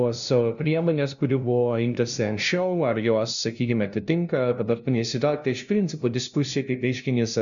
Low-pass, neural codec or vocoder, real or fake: 7.2 kHz; codec, 16 kHz, 0.5 kbps, FunCodec, trained on LibriTTS, 25 frames a second; fake